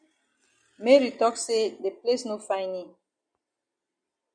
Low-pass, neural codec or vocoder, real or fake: 9.9 kHz; none; real